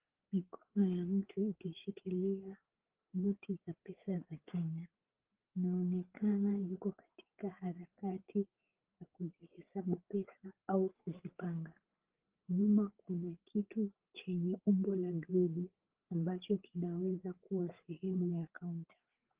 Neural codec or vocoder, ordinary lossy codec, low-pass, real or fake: codec, 16 kHz, 8 kbps, FreqCodec, larger model; Opus, 16 kbps; 3.6 kHz; fake